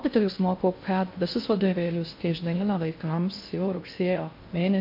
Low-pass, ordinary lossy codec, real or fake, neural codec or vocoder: 5.4 kHz; MP3, 32 kbps; fake; codec, 16 kHz in and 24 kHz out, 0.6 kbps, FocalCodec, streaming, 2048 codes